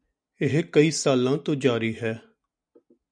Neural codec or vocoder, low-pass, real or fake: none; 9.9 kHz; real